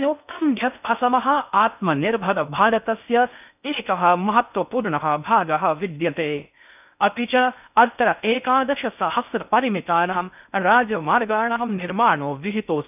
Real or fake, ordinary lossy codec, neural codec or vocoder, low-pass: fake; none; codec, 16 kHz in and 24 kHz out, 0.6 kbps, FocalCodec, streaming, 4096 codes; 3.6 kHz